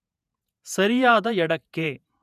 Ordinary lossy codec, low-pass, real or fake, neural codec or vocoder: none; 14.4 kHz; fake; vocoder, 48 kHz, 128 mel bands, Vocos